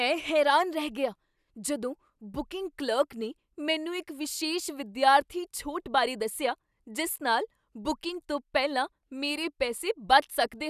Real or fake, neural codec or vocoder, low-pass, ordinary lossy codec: real; none; 14.4 kHz; none